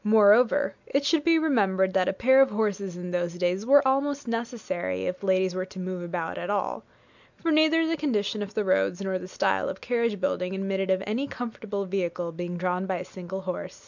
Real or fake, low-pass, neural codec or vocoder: real; 7.2 kHz; none